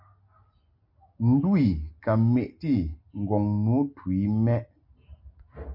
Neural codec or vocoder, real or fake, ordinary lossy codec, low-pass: none; real; MP3, 32 kbps; 5.4 kHz